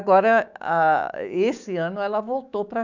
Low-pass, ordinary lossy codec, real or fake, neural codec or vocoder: 7.2 kHz; none; fake; codec, 16 kHz, 6 kbps, DAC